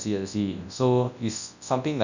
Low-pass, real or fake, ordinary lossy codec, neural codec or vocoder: 7.2 kHz; fake; none; codec, 24 kHz, 0.9 kbps, WavTokenizer, large speech release